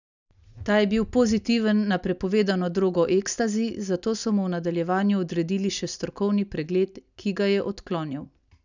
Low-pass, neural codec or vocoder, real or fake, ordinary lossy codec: 7.2 kHz; none; real; none